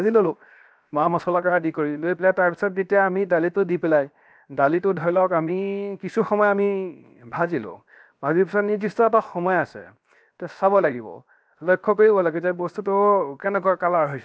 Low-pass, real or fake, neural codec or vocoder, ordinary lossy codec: none; fake; codec, 16 kHz, 0.7 kbps, FocalCodec; none